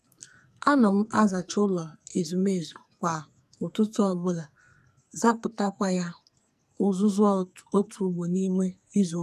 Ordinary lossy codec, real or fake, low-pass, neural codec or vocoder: none; fake; 14.4 kHz; codec, 44.1 kHz, 2.6 kbps, SNAC